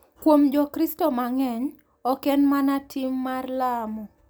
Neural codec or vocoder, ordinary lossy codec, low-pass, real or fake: none; none; none; real